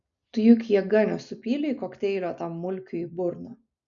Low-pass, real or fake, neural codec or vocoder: 7.2 kHz; real; none